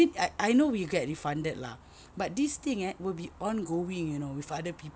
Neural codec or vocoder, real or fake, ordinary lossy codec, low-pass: none; real; none; none